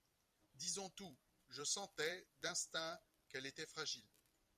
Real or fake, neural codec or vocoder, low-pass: fake; vocoder, 44.1 kHz, 128 mel bands every 256 samples, BigVGAN v2; 14.4 kHz